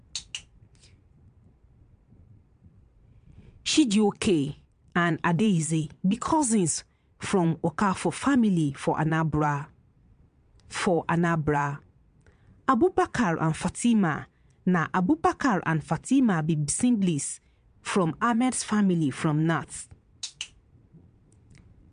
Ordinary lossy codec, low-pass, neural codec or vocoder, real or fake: MP3, 64 kbps; 9.9 kHz; vocoder, 22.05 kHz, 80 mel bands, Vocos; fake